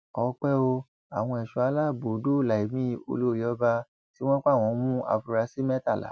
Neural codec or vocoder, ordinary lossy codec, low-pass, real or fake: none; none; none; real